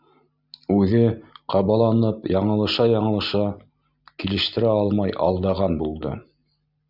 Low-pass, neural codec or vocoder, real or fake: 5.4 kHz; none; real